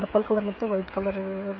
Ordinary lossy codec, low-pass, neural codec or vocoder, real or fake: MP3, 32 kbps; 7.2 kHz; codec, 16 kHz, 16 kbps, FreqCodec, smaller model; fake